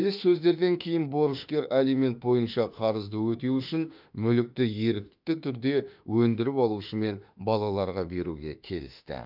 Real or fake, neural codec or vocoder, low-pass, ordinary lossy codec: fake; autoencoder, 48 kHz, 32 numbers a frame, DAC-VAE, trained on Japanese speech; 5.4 kHz; none